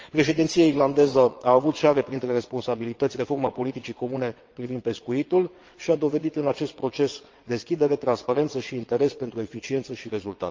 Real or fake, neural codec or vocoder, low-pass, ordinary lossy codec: fake; vocoder, 22.05 kHz, 80 mel bands, WaveNeXt; 7.2 kHz; Opus, 24 kbps